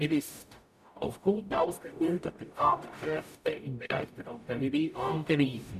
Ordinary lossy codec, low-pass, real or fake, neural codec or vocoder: none; 14.4 kHz; fake; codec, 44.1 kHz, 0.9 kbps, DAC